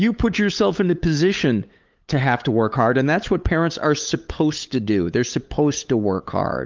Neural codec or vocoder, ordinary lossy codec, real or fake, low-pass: codec, 16 kHz, 8 kbps, FunCodec, trained on LibriTTS, 25 frames a second; Opus, 32 kbps; fake; 7.2 kHz